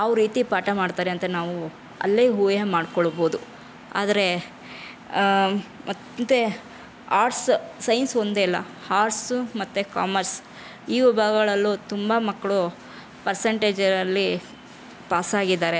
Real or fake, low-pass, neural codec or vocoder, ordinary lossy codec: real; none; none; none